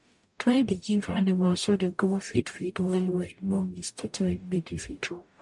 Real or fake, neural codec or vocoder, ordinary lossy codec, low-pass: fake; codec, 44.1 kHz, 0.9 kbps, DAC; MP3, 64 kbps; 10.8 kHz